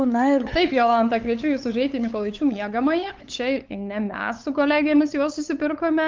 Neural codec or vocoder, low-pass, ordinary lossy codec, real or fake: codec, 16 kHz, 8 kbps, FunCodec, trained on LibriTTS, 25 frames a second; 7.2 kHz; Opus, 32 kbps; fake